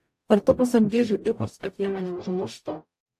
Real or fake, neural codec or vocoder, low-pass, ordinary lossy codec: fake; codec, 44.1 kHz, 0.9 kbps, DAC; 14.4 kHz; AAC, 64 kbps